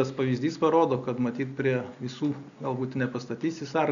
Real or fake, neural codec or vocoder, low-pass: real; none; 7.2 kHz